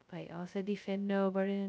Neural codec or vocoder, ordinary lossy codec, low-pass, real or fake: codec, 16 kHz, 0.2 kbps, FocalCodec; none; none; fake